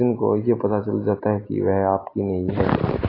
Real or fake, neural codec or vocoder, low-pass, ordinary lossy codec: real; none; 5.4 kHz; AAC, 24 kbps